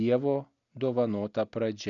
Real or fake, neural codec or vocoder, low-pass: real; none; 7.2 kHz